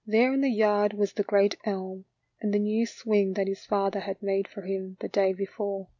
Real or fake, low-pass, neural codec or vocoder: real; 7.2 kHz; none